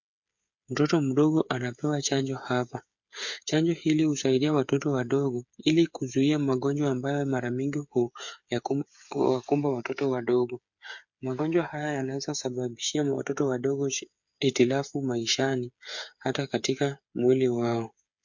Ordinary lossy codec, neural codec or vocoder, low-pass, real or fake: MP3, 48 kbps; codec, 16 kHz, 8 kbps, FreqCodec, smaller model; 7.2 kHz; fake